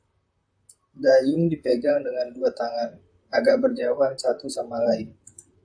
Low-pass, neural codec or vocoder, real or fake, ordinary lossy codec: 9.9 kHz; vocoder, 44.1 kHz, 128 mel bands, Pupu-Vocoder; fake; AAC, 64 kbps